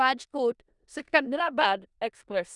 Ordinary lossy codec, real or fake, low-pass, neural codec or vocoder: none; fake; 10.8 kHz; codec, 16 kHz in and 24 kHz out, 0.4 kbps, LongCat-Audio-Codec, four codebook decoder